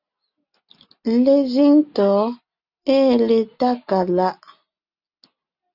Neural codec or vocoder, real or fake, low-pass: none; real; 5.4 kHz